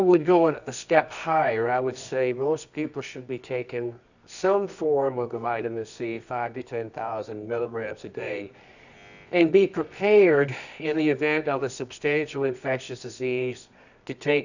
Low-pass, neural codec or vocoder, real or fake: 7.2 kHz; codec, 24 kHz, 0.9 kbps, WavTokenizer, medium music audio release; fake